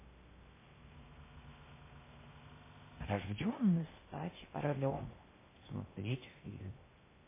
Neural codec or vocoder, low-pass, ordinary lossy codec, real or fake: codec, 16 kHz in and 24 kHz out, 0.6 kbps, FocalCodec, streaming, 2048 codes; 3.6 kHz; MP3, 16 kbps; fake